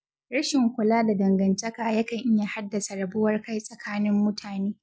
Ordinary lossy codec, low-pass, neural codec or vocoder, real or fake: none; none; none; real